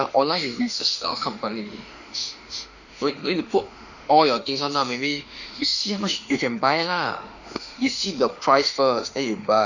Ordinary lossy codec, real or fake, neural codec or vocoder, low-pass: none; fake; autoencoder, 48 kHz, 32 numbers a frame, DAC-VAE, trained on Japanese speech; 7.2 kHz